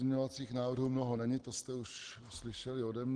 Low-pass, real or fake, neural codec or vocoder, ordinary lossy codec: 9.9 kHz; real; none; Opus, 16 kbps